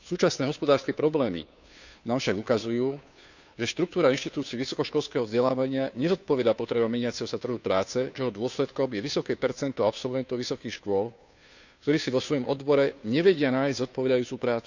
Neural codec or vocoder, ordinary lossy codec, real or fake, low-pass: codec, 16 kHz, 2 kbps, FunCodec, trained on Chinese and English, 25 frames a second; none; fake; 7.2 kHz